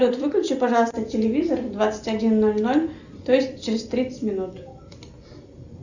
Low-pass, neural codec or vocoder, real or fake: 7.2 kHz; none; real